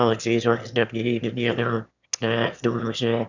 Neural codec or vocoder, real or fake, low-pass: autoencoder, 22.05 kHz, a latent of 192 numbers a frame, VITS, trained on one speaker; fake; 7.2 kHz